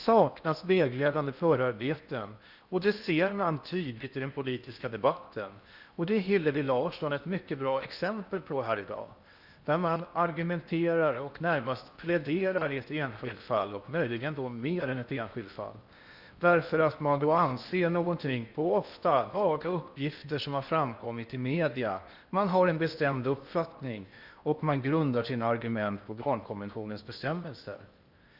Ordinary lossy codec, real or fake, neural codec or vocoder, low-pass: Opus, 64 kbps; fake; codec, 16 kHz in and 24 kHz out, 0.8 kbps, FocalCodec, streaming, 65536 codes; 5.4 kHz